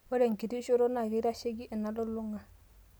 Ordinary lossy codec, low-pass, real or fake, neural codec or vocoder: none; none; real; none